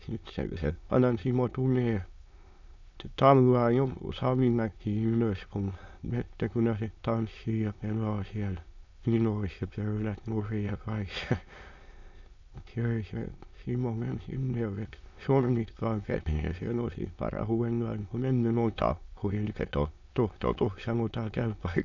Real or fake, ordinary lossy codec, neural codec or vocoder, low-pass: fake; none; autoencoder, 22.05 kHz, a latent of 192 numbers a frame, VITS, trained on many speakers; 7.2 kHz